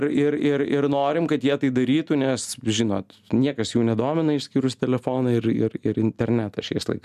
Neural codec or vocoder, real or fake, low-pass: none; real; 14.4 kHz